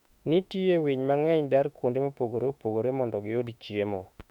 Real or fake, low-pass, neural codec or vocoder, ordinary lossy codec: fake; 19.8 kHz; autoencoder, 48 kHz, 32 numbers a frame, DAC-VAE, trained on Japanese speech; none